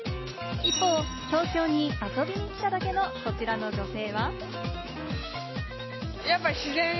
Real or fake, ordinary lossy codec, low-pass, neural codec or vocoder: real; MP3, 24 kbps; 7.2 kHz; none